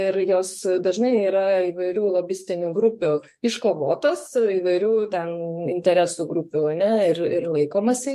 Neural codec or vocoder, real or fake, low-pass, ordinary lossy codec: codec, 44.1 kHz, 2.6 kbps, SNAC; fake; 14.4 kHz; MP3, 64 kbps